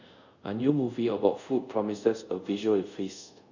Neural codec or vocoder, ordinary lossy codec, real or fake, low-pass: codec, 24 kHz, 0.5 kbps, DualCodec; none; fake; 7.2 kHz